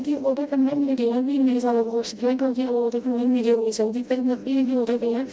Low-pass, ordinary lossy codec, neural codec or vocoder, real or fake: none; none; codec, 16 kHz, 0.5 kbps, FreqCodec, smaller model; fake